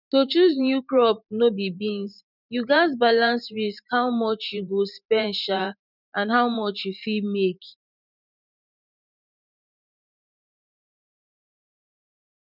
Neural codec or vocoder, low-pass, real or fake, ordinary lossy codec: vocoder, 44.1 kHz, 128 mel bands every 512 samples, BigVGAN v2; 5.4 kHz; fake; none